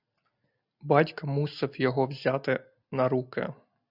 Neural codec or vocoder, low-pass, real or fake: none; 5.4 kHz; real